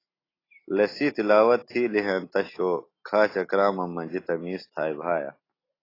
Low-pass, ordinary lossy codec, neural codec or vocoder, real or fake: 5.4 kHz; AAC, 24 kbps; none; real